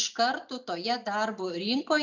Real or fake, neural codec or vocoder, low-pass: real; none; 7.2 kHz